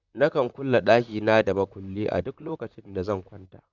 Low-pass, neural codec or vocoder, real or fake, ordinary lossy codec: 7.2 kHz; vocoder, 44.1 kHz, 128 mel bands, Pupu-Vocoder; fake; Opus, 64 kbps